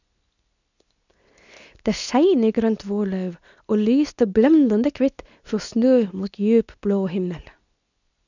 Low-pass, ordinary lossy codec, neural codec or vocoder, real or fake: 7.2 kHz; none; codec, 24 kHz, 0.9 kbps, WavTokenizer, medium speech release version 2; fake